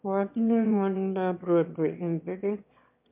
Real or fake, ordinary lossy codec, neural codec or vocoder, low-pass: fake; MP3, 32 kbps; autoencoder, 22.05 kHz, a latent of 192 numbers a frame, VITS, trained on one speaker; 3.6 kHz